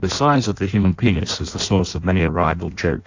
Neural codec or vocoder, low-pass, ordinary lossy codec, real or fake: codec, 16 kHz in and 24 kHz out, 0.6 kbps, FireRedTTS-2 codec; 7.2 kHz; AAC, 48 kbps; fake